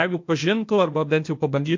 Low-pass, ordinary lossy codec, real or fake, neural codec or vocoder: 7.2 kHz; MP3, 48 kbps; fake; codec, 16 kHz, 0.8 kbps, ZipCodec